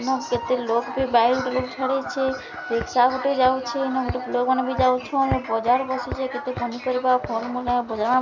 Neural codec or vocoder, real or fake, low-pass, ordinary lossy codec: none; real; 7.2 kHz; none